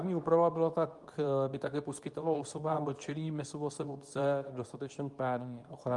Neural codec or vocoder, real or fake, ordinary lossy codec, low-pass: codec, 24 kHz, 0.9 kbps, WavTokenizer, medium speech release version 2; fake; Opus, 32 kbps; 10.8 kHz